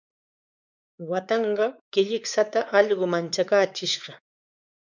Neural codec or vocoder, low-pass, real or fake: codec, 16 kHz, 4 kbps, X-Codec, WavLM features, trained on Multilingual LibriSpeech; 7.2 kHz; fake